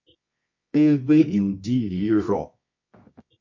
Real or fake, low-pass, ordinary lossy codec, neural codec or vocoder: fake; 7.2 kHz; MP3, 48 kbps; codec, 24 kHz, 0.9 kbps, WavTokenizer, medium music audio release